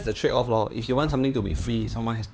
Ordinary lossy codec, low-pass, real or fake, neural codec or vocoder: none; none; fake; codec, 16 kHz, 4 kbps, X-Codec, HuBERT features, trained on LibriSpeech